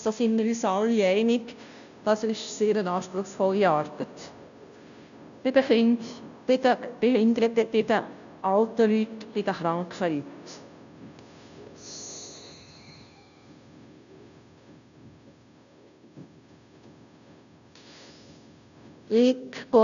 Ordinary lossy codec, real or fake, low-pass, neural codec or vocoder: none; fake; 7.2 kHz; codec, 16 kHz, 0.5 kbps, FunCodec, trained on Chinese and English, 25 frames a second